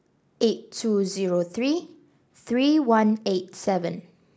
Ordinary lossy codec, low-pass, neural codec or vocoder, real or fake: none; none; none; real